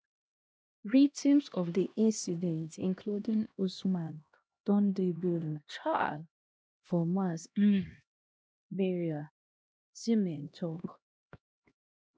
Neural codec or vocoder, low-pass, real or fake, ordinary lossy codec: codec, 16 kHz, 1 kbps, X-Codec, HuBERT features, trained on LibriSpeech; none; fake; none